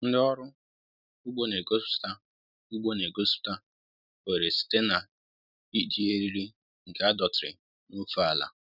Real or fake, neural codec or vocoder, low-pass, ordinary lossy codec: real; none; 5.4 kHz; none